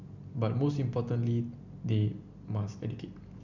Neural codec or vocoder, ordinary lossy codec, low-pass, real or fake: none; none; 7.2 kHz; real